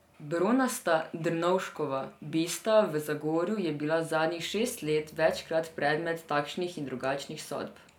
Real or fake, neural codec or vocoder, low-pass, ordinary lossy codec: real; none; 19.8 kHz; none